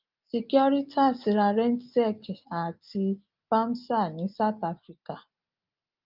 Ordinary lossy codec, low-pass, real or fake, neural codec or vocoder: Opus, 24 kbps; 5.4 kHz; real; none